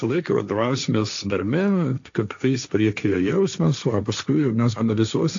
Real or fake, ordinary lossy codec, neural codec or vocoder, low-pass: fake; AAC, 64 kbps; codec, 16 kHz, 1.1 kbps, Voila-Tokenizer; 7.2 kHz